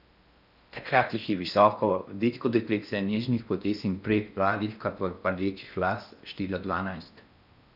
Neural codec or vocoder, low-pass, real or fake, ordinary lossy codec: codec, 16 kHz in and 24 kHz out, 0.6 kbps, FocalCodec, streaming, 4096 codes; 5.4 kHz; fake; none